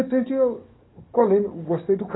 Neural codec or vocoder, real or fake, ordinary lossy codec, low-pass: codec, 44.1 kHz, 7.8 kbps, DAC; fake; AAC, 16 kbps; 7.2 kHz